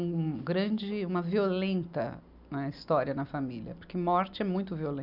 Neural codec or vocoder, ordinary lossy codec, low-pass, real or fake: none; none; 5.4 kHz; real